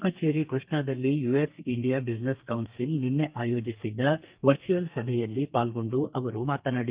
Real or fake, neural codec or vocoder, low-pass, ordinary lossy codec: fake; codec, 32 kHz, 1.9 kbps, SNAC; 3.6 kHz; Opus, 24 kbps